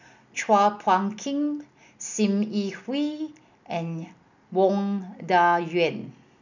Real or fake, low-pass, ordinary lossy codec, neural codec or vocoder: real; 7.2 kHz; none; none